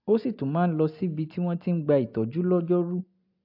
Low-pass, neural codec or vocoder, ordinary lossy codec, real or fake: 5.4 kHz; none; AAC, 48 kbps; real